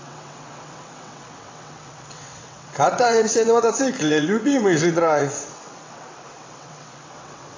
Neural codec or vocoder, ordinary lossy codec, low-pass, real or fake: vocoder, 22.05 kHz, 80 mel bands, WaveNeXt; AAC, 32 kbps; 7.2 kHz; fake